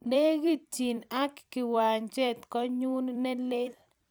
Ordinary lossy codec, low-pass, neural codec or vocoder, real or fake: none; none; vocoder, 44.1 kHz, 128 mel bands every 256 samples, BigVGAN v2; fake